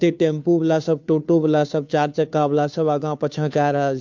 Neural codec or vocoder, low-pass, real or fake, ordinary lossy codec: codec, 16 kHz, 2 kbps, FunCodec, trained on Chinese and English, 25 frames a second; 7.2 kHz; fake; MP3, 64 kbps